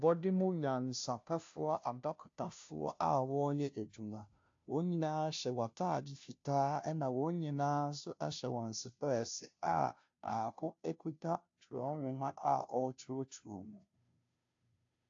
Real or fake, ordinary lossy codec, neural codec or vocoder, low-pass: fake; AAC, 48 kbps; codec, 16 kHz, 0.5 kbps, FunCodec, trained on Chinese and English, 25 frames a second; 7.2 kHz